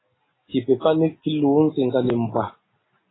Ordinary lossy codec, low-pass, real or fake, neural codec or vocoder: AAC, 16 kbps; 7.2 kHz; real; none